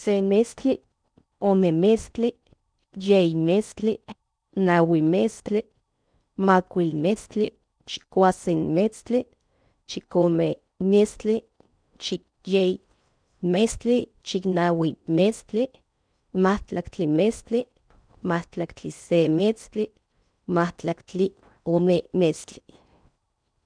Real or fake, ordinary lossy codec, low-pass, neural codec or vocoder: fake; none; 9.9 kHz; codec, 16 kHz in and 24 kHz out, 0.6 kbps, FocalCodec, streaming, 4096 codes